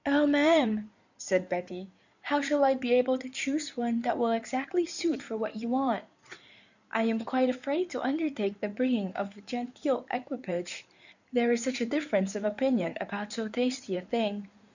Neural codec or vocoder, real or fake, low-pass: codec, 16 kHz in and 24 kHz out, 2.2 kbps, FireRedTTS-2 codec; fake; 7.2 kHz